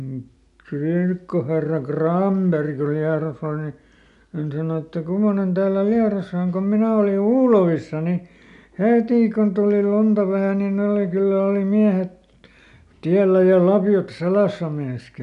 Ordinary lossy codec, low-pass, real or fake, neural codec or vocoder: none; 10.8 kHz; real; none